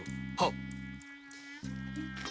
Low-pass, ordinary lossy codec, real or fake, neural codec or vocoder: none; none; real; none